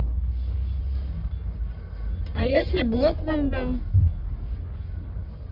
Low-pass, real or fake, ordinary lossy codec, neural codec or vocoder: 5.4 kHz; fake; MP3, 48 kbps; codec, 44.1 kHz, 1.7 kbps, Pupu-Codec